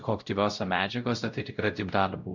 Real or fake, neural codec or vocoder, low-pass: fake; codec, 16 kHz, 0.5 kbps, X-Codec, WavLM features, trained on Multilingual LibriSpeech; 7.2 kHz